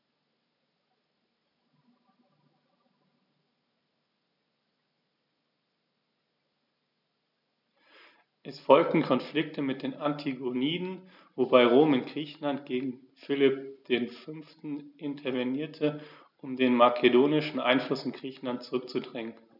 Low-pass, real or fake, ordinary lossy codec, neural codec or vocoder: 5.4 kHz; real; none; none